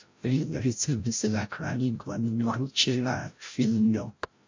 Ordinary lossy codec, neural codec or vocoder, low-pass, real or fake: MP3, 48 kbps; codec, 16 kHz, 0.5 kbps, FreqCodec, larger model; 7.2 kHz; fake